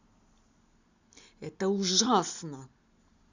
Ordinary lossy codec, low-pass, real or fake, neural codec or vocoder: Opus, 64 kbps; 7.2 kHz; real; none